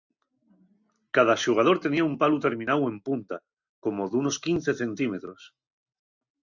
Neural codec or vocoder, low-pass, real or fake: none; 7.2 kHz; real